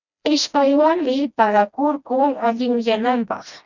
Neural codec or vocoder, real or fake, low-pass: codec, 16 kHz, 1 kbps, FreqCodec, smaller model; fake; 7.2 kHz